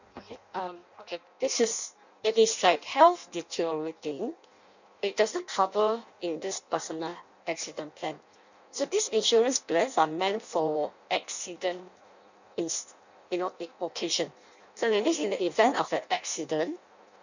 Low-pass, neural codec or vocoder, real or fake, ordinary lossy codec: 7.2 kHz; codec, 16 kHz in and 24 kHz out, 0.6 kbps, FireRedTTS-2 codec; fake; none